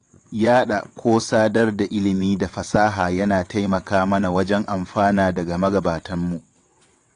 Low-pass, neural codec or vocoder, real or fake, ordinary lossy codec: 9.9 kHz; vocoder, 22.05 kHz, 80 mel bands, WaveNeXt; fake; AAC, 48 kbps